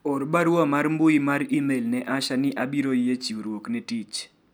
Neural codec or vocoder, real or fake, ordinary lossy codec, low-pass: none; real; none; none